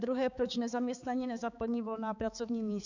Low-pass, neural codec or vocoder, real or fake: 7.2 kHz; codec, 16 kHz, 4 kbps, X-Codec, HuBERT features, trained on balanced general audio; fake